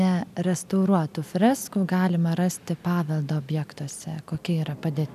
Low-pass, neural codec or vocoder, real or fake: 14.4 kHz; none; real